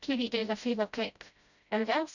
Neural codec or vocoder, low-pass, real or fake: codec, 16 kHz, 0.5 kbps, FreqCodec, smaller model; 7.2 kHz; fake